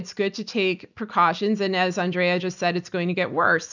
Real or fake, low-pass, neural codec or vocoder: real; 7.2 kHz; none